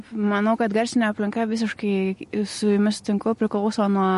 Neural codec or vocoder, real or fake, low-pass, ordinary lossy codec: none; real; 14.4 kHz; MP3, 48 kbps